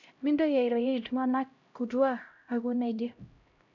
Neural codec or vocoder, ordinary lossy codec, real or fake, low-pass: codec, 16 kHz, 0.5 kbps, X-Codec, HuBERT features, trained on LibriSpeech; none; fake; 7.2 kHz